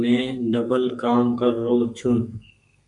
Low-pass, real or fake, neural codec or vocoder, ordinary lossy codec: 10.8 kHz; fake; codec, 32 kHz, 1.9 kbps, SNAC; MP3, 96 kbps